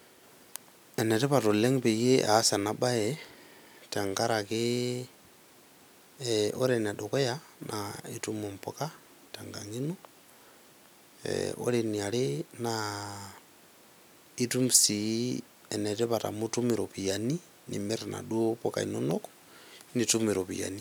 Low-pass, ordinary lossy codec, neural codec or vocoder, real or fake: none; none; none; real